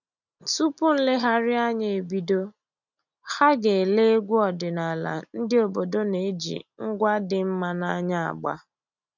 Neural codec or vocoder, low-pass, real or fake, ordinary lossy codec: none; 7.2 kHz; real; none